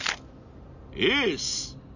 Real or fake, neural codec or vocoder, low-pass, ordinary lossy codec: real; none; 7.2 kHz; none